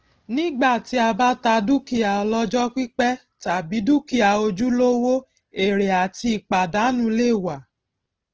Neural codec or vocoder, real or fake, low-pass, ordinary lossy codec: none; real; 7.2 kHz; Opus, 16 kbps